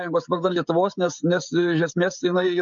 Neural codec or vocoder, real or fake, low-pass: codec, 16 kHz, 16 kbps, FreqCodec, smaller model; fake; 7.2 kHz